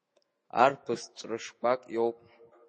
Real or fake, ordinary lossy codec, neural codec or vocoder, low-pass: fake; MP3, 32 kbps; autoencoder, 48 kHz, 128 numbers a frame, DAC-VAE, trained on Japanese speech; 10.8 kHz